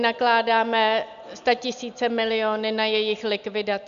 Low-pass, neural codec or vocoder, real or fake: 7.2 kHz; none; real